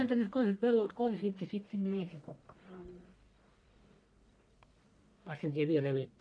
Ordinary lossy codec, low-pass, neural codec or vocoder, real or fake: none; 9.9 kHz; codec, 44.1 kHz, 1.7 kbps, Pupu-Codec; fake